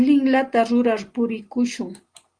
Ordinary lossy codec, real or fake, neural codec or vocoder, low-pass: Opus, 24 kbps; real; none; 9.9 kHz